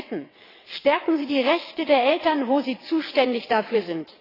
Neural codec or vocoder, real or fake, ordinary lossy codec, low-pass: vocoder, 22.05 kHz, 80 mel bands, WaveNeXt; fake; AAC, 24 kbps; 5.4 kHz